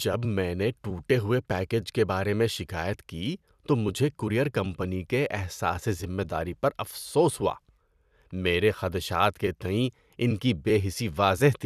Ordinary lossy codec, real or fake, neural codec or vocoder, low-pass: none; fake; vocoder, 44.1 kHz, 128 mel bands every 256 samples, BigVGAN v2; 14.4 kHz